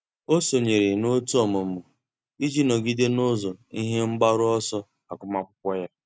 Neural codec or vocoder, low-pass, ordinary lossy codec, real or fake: none; none; none; real